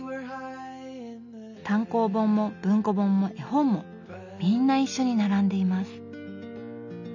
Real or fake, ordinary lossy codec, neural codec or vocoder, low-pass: real; none; none; 7.2 kHz